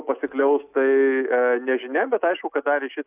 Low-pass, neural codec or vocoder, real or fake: 3.6 kHz; none; real